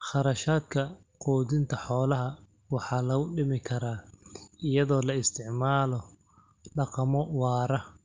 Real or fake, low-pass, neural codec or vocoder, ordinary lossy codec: real; 7.2 kHz; none; Opus, 24 kbps